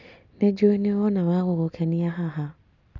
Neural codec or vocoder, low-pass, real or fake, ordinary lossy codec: none; 7.2 kHz; real; none